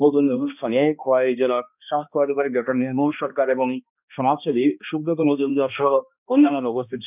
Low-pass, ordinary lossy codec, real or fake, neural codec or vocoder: 3.6 kHz; none; fake; codec, 16 kHz, 1 kbps, X-Codec, HuBERT features, trained on balanced general audio